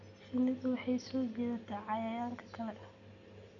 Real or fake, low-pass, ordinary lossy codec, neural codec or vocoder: real; 7.2 kHz; none; none